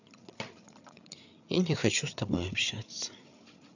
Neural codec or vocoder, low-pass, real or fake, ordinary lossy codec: codec, 16 kHz, 8 kbps, FreqCodec, larger model; 7.2 kHz; fake; none